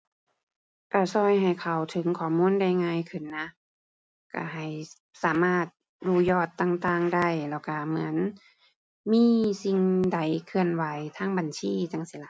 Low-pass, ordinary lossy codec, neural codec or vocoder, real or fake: none; none; none; real